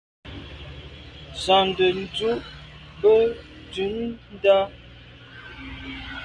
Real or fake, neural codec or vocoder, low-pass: real; none; 9.9 kHz